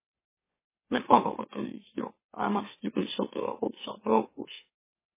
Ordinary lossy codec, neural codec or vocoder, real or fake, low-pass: MP3, 16 kbps; autoencoder, 44.1 kHz, a latent of 192 numbers a frame, MeloTTS; fake; 3.6 kHz